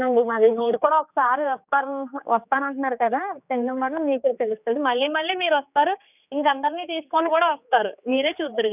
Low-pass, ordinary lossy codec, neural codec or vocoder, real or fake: 3.6 kHz; none; codec, 16 kHz, 4 kbps, X-Codec, HuBERT features, trained on general audio; fake